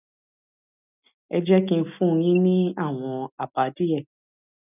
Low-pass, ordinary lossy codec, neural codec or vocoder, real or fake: 3.6 kHz; none; none; real